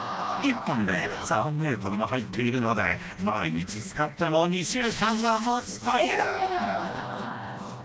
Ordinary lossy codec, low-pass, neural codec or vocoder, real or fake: none; none; codec, 16 kHz, 1 kbps, FreqCodec, smaller model; fake